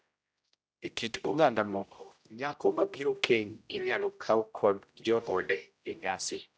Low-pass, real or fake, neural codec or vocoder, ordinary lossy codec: none; fake; codec, 16 kHz, 0.5 kbps, X-Codec, HuBERT features, trained on general audio; none